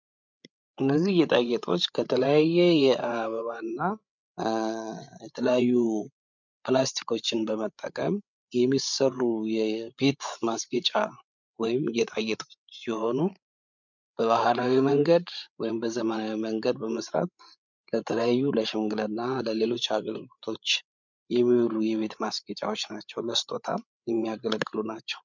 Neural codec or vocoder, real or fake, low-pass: codec, 16 kHz, 8 kbps, FreqCodec, larger model; fake; 7.2 kHz